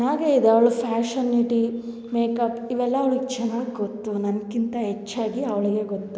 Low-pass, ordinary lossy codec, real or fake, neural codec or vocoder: none; none; real; none